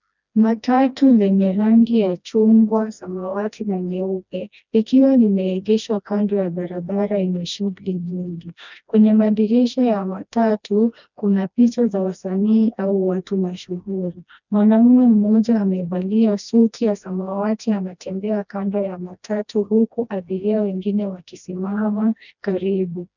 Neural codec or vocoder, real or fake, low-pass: codec, 16 kHz, 1 kbps, FreqCodec, smaller model; fake; 7.2 kHz